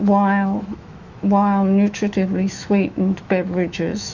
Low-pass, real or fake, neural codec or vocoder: 7.2 kHz; fake; autoencoder, 48 kHz, 128 numbers a frame, DAC-VAE, trained on Japanese speech